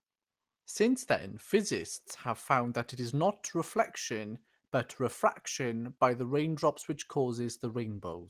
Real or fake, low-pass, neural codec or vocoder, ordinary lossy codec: real; 14.4 kHz; none; Opus, 24 kbps